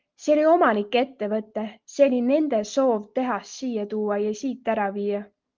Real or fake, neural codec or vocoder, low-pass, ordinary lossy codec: real; none; 7.2 kHz; Opus, 32 kbps